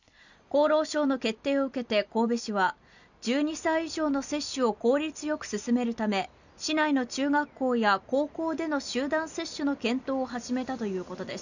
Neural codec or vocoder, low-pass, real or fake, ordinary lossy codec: none; 7.2 kHz; real; none